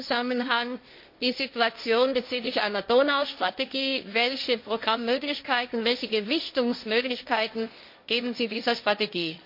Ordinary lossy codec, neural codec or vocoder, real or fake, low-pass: MP3, 32 kbps; codec, 16 kHz, 1.1 kbps, Voila-Tokenizer; fake; 5.4 kHz